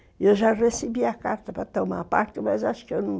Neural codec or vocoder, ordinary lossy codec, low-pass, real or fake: none; none; none; real